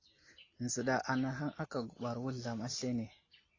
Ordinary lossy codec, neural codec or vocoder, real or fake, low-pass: AAC, 32 kbps; none; real; 7.2 kHz